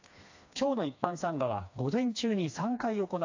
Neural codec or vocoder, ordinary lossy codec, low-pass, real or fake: codec, 16 kHz, 2 kbps, FreqCodec, smaller model; AAC, 48 kbps; 7.2 kHz; fake